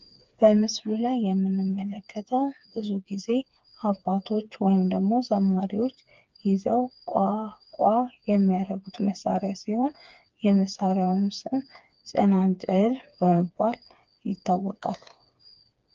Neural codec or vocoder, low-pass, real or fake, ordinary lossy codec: codec, 16 kHz, 4 kbps, FreqCodec, smaller model; 7.2 kHz; fake; Opus, 32 kbps